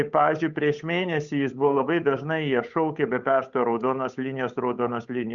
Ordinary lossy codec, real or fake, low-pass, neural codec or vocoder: Opus, 64 kbps; fake; 7.2 kHz; codec, 16 kHz, 8 kbps, FunCodec, trained on Chinese and English, 25 frames a second